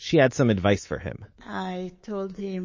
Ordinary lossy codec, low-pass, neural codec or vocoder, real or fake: MP3, 32 kbps; 7.2 kHz; codec, 24 kHz, 3.1 kbps, DualCodec; fake